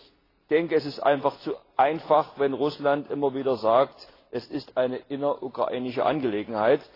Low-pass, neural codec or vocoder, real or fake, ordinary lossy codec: 5.4 kHz; none; real; AAC, 24 kbps